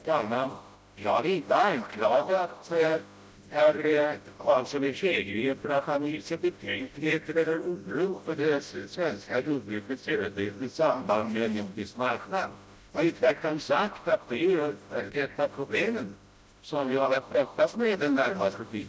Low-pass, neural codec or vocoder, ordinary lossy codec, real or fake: none; codec, 16 kHz, 0.5 kbps, FreqCodec, smaller model; none; fake